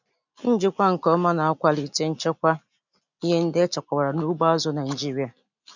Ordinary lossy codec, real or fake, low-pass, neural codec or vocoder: none; real; 7.2 kHz; none